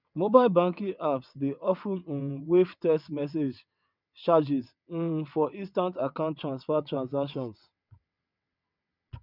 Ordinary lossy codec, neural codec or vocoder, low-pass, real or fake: none; vocoder, 22.05 kHz, 80 mel bands, WaveNeXt; 5.4 kHz; fake